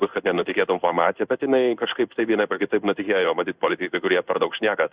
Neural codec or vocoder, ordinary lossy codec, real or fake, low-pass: codec, 16 kHz in and 24 kHz out, 1 kbps, XY-Tokenizer; Opus, 64 kbps; fake; 3.6 kHz